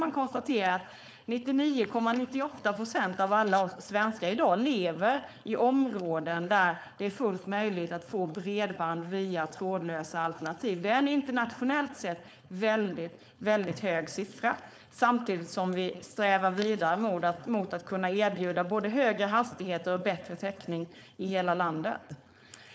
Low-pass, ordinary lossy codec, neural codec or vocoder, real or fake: none; none; codec, 16 kHz, 4.8 kbps, FACodec; fake